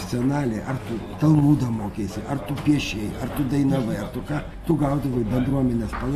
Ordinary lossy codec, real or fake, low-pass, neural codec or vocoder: AAC, 48 kbps; real; 14.4 kHz; none